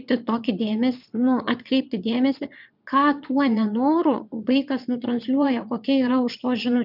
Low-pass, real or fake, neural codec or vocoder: 5.4 kHz; fake; vocoder, 22.05 kHz, 80 mel bands, Vocos